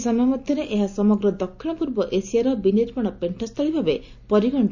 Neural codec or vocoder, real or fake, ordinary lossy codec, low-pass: none; real; Opus, 64 kbps; 7.2 kHz